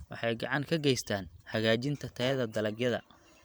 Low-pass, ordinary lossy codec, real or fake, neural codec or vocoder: none; none; fake; vocoder, 44.1 kHz, 128 mel bands every 512 samples, BigVGAN v2